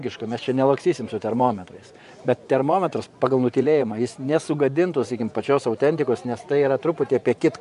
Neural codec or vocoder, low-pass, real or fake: vocoder, 24 kHz, 100 mel bands, Vocos; 10.8 kHz; fake